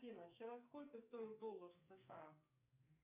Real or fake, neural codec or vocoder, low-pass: fake; codec, 44.1 kHz, 2.6 kbps, SNAC; 3.6 kHz